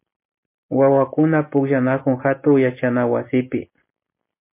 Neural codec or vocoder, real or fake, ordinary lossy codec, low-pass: none; real; MP3, 24 kbps; 3.6 kHz